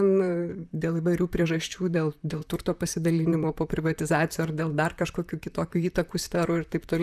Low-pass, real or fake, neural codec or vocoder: 14.4 kHz; fake; vocoder, 44.1 kHz, 128 mel bands, Pupu-Vocoder